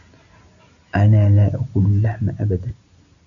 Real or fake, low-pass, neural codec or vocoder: real; 7.2 kHz; none